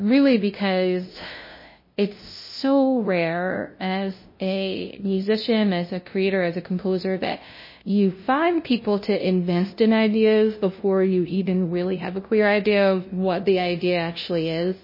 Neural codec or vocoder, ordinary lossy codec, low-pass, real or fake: codec, 16 kHz, 0.5 kbps, FunCodec, trained on LibriTTS, 25 frames a second; MP3, 24 kbps; 5.4 kHz; fake